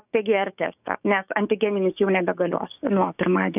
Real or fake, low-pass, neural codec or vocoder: fake; 3.6 kHz; codec, 16 kHz, 6 kbps, DAC